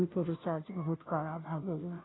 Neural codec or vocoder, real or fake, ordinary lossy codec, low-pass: codec, 16 kHz, 0.5 kbps, FunCodec, trained on Chinese and English, 25 frames a second; fake; AAC, 16 kbps; 7.2 kHz